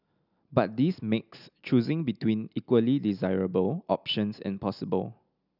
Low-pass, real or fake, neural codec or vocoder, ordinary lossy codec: 5.4 kHz; real; none; none